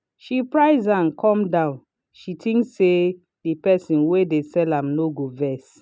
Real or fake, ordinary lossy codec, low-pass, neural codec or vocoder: real; none; none; none